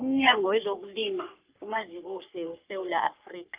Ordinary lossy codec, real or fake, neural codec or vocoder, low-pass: Opus, 24 kbps; fake; codec, 44.1 kHz, 3.4 kbps, Pupu-Codec; 3.6 kHz